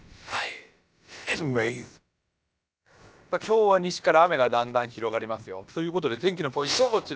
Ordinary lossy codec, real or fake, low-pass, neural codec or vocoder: none; fake; none; codec, 16 kHz, about 1 kbps, DyCAST, with the encoder's durations